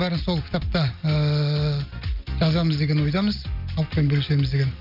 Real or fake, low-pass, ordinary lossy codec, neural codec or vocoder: real; 5.4 kHz; none; none